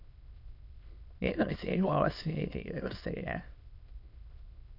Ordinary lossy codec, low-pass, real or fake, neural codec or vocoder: none; 5.4 kHz; fake; autoencoder, 22.05 kHz, a latent of 192 numbers a frame, VITS, trained on many speakers